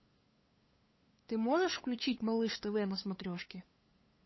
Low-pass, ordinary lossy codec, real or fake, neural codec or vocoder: 7.2 kHz; MP3, 24 kbps; fake; codec, 16 kHz, 8 kbps, FunCodec, trained on LibriTTS, 25 frames a second